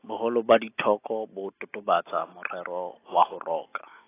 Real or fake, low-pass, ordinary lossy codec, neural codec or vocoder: real; 3.6 kHz; AAC, 24 kbps; none